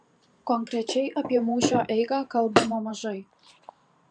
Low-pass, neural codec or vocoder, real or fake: 9.9 kHz; vocoder, 44.1 kHz, 128 mel bands every 512 samples, BigVGAN v2; fake